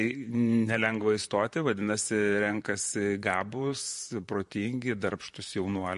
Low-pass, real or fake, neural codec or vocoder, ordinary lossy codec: 14.4 kHz; fake; vocoder, 44.1 kHz, 128 mel bands, Pupu-Vocoder; MP3, 48 kbps